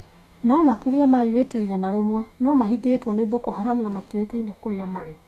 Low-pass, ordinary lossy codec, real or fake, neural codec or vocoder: 14.4 kHz; none; fake; codec, 44.1 kHz, 2.6 kbps, DAC